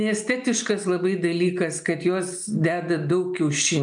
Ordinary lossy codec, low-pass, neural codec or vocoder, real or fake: MP3, 96 kbps; 9.9 kHz; none; real